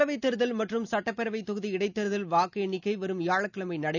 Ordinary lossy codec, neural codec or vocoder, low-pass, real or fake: none; none; 7.2 kHz; real